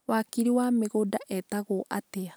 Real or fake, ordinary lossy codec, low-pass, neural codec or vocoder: real; none; none; none